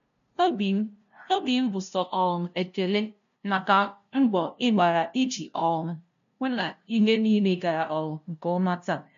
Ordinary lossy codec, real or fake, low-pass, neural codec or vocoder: none; fake; 7.2 kHz; codec, 16 kHz, 0.5 kbps, FunCodec, trained on LibriTTS, 25 frames a second